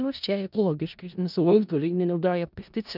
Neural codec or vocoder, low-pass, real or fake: codec, 16 kHz in and 24 kHz out, 0.4 kbps, LongCat-Audio-Codec, four codebook decoder; 5.4 kHz; fake